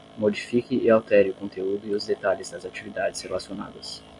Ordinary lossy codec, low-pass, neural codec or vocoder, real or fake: MP3, 64 kbps; 10.8 kHz; none; real